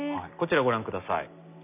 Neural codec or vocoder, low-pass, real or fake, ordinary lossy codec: none; 3.6 kHz; real; none